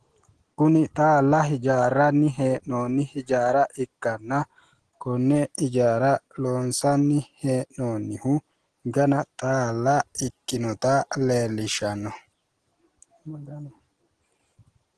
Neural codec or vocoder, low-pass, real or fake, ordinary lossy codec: none; 9.9 kHz; real; Opus, 16 kbps